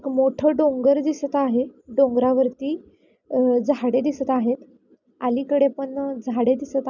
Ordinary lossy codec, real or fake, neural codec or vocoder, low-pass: none; real; none; 7.2 kHz